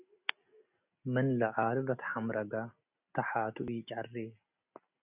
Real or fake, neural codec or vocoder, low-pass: real; none; 3.6 kHz